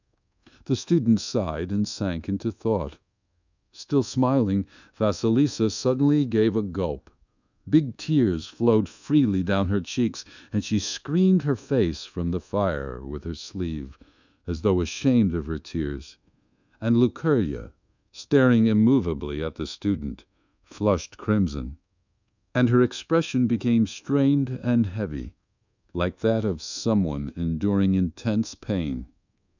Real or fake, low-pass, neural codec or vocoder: fake; 7.2 kHz; codec, 24 kHz, 1.2 kbps, DualCodec